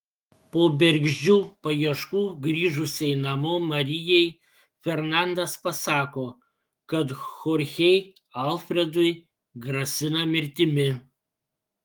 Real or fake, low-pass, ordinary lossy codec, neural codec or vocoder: fake; 19.8 kHz; Opus, 24 kbps; autoencoder, 48 kHz, 128 numbers a frame, DAC-VAE, trained on Japanese speech